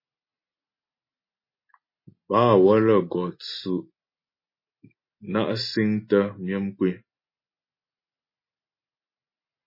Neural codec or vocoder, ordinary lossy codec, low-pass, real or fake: none; MP3, 24 kbps; 5.4 kHz; real